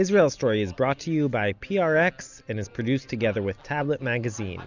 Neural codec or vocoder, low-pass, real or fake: none; 7.2 kHz; real